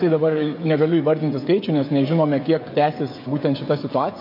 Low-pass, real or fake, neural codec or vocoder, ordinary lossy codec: 5.4 kHz; fake; codec, 16 kHz, 16 kbps, FreqCodec, smaller model; MP3, 32 kbps